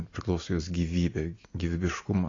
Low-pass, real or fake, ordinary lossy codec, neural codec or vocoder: 7.2 kHz; real; AAC, 32 kbps; none